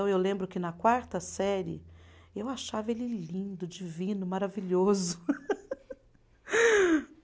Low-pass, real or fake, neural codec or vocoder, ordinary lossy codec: none; real; none; none